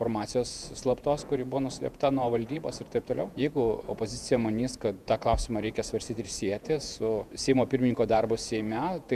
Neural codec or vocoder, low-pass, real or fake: vocoder, 48 kHz, 128 mel bands, Vocos; 14.4 kHz; fake